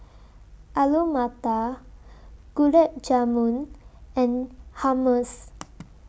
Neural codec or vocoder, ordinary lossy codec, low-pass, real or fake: none; none; none; real